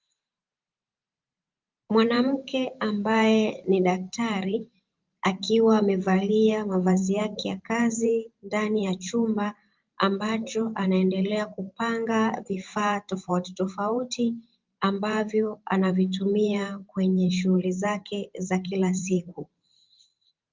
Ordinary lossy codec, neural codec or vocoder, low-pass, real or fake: Opus, 24 kbps; vocoder, 44.1 kHz, 128 mel bands every 512 samples, BigVGAN v2; 7.2 kHz; fake